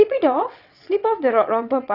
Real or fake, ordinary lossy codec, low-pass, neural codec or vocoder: fake; none; 5.4 kHz; vocoder, 22.05 kHz, 80 mel bands, WaveNeXt